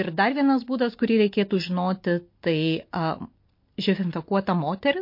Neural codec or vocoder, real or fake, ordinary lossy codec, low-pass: none; real; MP3, 32 kbps; 5.4 kHz